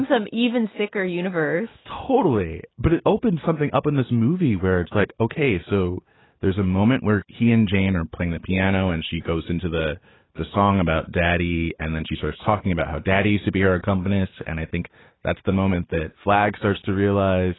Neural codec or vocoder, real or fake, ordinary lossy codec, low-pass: none; real; AAC, 16 kbps; 7.2 kHz